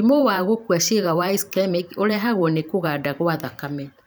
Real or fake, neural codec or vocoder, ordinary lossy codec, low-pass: fake; vocoder, 44.1 kHz, 128 mel bands every 512 samples, BigVGAN v2; none; none